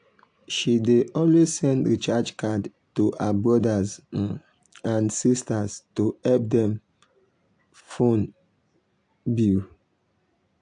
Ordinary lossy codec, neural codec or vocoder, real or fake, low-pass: AAC, 64 kbps; none; real; 9.9 kHz